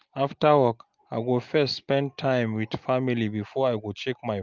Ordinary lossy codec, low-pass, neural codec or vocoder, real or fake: Opus, 24 kbps; 7.2 kHz; none; real